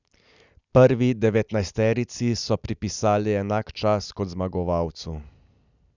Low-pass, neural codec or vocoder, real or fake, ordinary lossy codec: 7.2 kHz; none; real; none